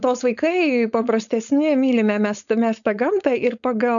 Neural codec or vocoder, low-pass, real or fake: codec, 16 kHz, 4.8 kbps, FACodec; 7.2 kHz; fake